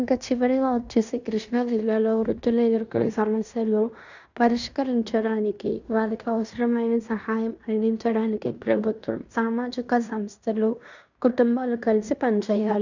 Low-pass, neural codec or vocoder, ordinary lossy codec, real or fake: 7.2 kHz; codec, 16 kHz in and 24 kHz out, 0.9 kbps, LongCat-Audio-Codec, fine tuned four codebook decoder; none; fake